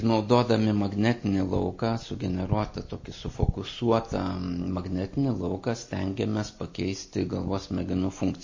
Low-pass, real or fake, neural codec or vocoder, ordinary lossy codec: 7.2 kHz; real; none; MP3, 32 kbps